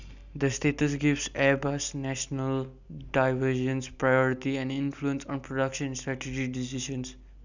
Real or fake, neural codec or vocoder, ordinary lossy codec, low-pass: real; none; none; 7.2 kHz